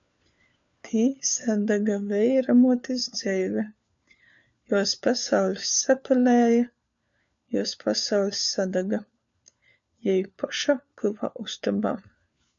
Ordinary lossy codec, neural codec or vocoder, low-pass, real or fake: MP3, 64 kbps; codec, 16 kHz, 4 kbps, FunCodec, trained on LibriTTS, 50 frames a second; 7.2 kHz; fake